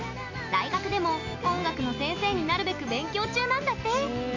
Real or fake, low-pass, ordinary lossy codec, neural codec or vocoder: real; 7.2 kHz; none; none